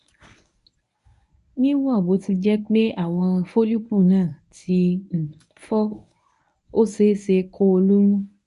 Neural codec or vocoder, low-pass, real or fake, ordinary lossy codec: codec, 24 kHz, 0.9 kbps, WavTokenizer, medium speech release version 1; 10.8 kHz; fake; none